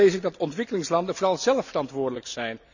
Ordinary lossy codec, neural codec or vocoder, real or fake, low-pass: none; none; real; 7.2 kHz